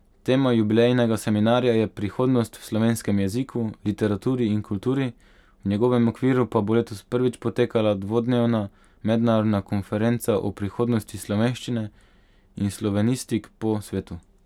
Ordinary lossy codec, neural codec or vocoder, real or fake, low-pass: none; none; real; 19.8 kHz